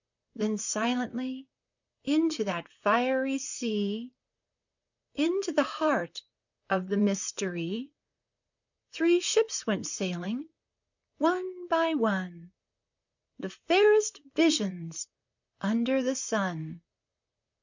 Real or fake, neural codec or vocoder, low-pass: fake; vocoder, 44.1 kHz, 128 mel bands, Pupu-Vocoder; 7.2 kHz